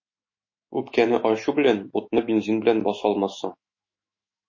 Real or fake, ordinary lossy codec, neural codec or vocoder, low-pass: fake; MP3, 32 kbps; autoencoder, 48 kHz, 128 numbers a frame, DAC-VAE, trained on Japanese speech; 7.2 kHz